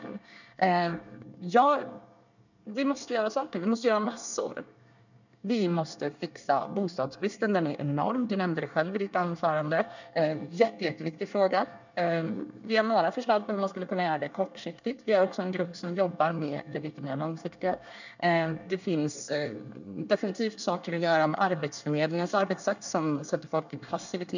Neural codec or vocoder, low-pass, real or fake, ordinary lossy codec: codec, 24 kHz, 1 kbps, SNAC; 7.2 kHz; fake; none